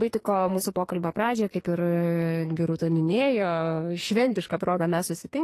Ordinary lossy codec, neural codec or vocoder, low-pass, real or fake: AAC, 48 kbps; codec, 44.1 kHz, 2.6 kbps, SNAC; 14.4 kHz; fake